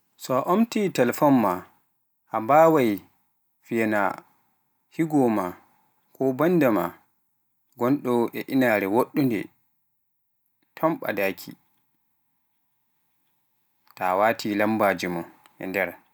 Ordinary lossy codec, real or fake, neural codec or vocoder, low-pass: none; real; none; none